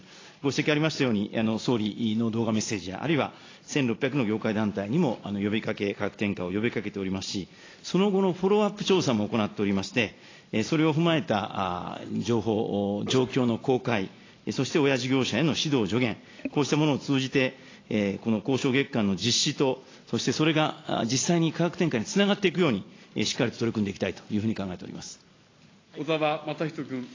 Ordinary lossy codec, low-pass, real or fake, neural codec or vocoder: AAC, 32 kbps; 7.2 kHz; real; none